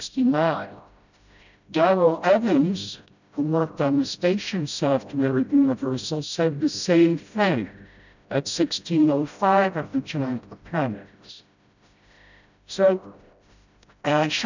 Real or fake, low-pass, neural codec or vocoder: fake; 7.2 kHz; codec, 16 kHz, 0.5 kbps, FreqCodec, smaller model